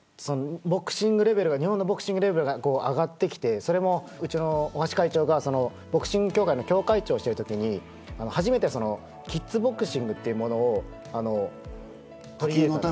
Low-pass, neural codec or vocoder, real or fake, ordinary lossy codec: none; none; real; none